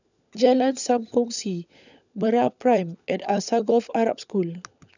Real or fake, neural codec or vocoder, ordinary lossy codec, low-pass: fake; codec, 16 kHz, 16 kbps, FunCodec, trained on LibriTTS, 50 frames a second; none; 7.2 kHz